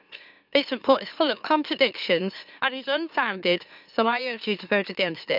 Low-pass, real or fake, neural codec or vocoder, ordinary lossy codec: 5.4 kHz; fake; autoencoder, 44.1 kHz, a latent of 192 numbers a frame, MeloTTS; none